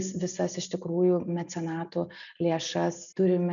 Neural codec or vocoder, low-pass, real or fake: none; 7.2 kHz; real